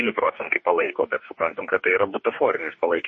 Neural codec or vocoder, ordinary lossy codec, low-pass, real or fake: codec, 44.1 kHz, 2.6 kbps, DAC; MP3, 32 kbps; 9.9 kHz; fake